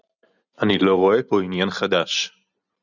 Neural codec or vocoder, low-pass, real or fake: none; 7.2 kHz; real